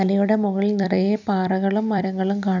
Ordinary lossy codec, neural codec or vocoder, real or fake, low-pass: none; vocoder, 44.1 kHz, 128 mel bands every 256 samples, BigVGAN v2; fake; 7.2 kHz